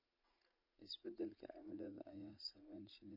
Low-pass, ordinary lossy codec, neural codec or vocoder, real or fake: 5.4 kHz; MP3, 32 kbps; vocoder, 44.1 kHz, 80 mel bands, Vocos; fake